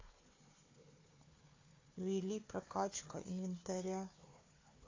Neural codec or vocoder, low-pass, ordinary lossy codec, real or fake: codec, 16 kHz, 4 kbps, FunCodec, trained on Chinese and English, 50 frames a second; 7.2 kHz; AAC, 32 kbps; fake